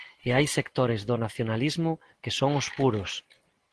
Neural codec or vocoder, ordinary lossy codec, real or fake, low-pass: none; Opus, 16 kbps; real; 10.8 kHz